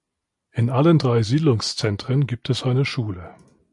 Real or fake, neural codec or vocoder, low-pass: real; none; 10.8 kHz